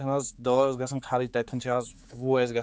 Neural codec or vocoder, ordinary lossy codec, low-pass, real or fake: codec, 16 kHz, 4 kbps, X-Codec, HuBERT features, trained on general audio; none; none; fake